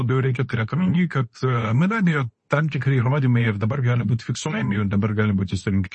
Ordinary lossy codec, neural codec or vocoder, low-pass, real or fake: MP3, 32 kbps; codec, 24 kHz, 0.9 kbps, WavTokenizer, medium speech release version 2; 10.8 kHz; fake